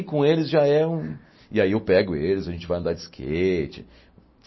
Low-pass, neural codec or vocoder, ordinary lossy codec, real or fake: 7.2 kHz; none; MP3, 24 kbps; real